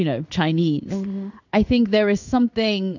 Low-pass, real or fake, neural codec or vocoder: 7.2 kHz; fake; codec, 16 kHz in and 24 kHz out, 1 kbps, XY-Tokenizer